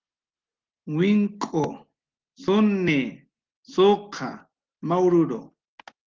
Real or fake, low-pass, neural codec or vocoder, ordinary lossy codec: real; 7.2 kHz; none; Opus, 16 kbps